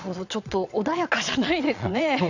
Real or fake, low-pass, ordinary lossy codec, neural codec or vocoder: fake; 7.2 kHz; none; vocoder, 22.05 kHz, 80 mel bands, Vocos